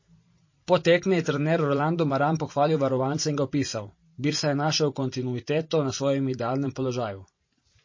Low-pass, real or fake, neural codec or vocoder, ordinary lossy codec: 7.2 kHz; fake; vocoder, 44.1 kHz, 128 mel bands every 512 samples, BigVGAN v2; MP3, 32 kbps